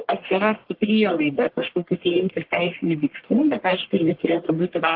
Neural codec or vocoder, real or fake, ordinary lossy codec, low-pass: codec, 44.1 kHz, 1.7 kbps, Pupu-Codec; fake; Opus, 16 kbps; 5.4 kHz